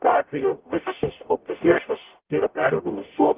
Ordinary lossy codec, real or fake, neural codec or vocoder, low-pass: Opus, 32 kbps; fake; codec, 44.1 kHz, 0.9 kbps, DAC; 3.6 kHz